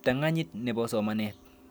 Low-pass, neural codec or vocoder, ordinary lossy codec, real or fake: none; none; none; real